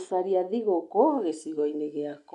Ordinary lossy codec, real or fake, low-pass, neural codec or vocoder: none; real; 10.8 kHz; none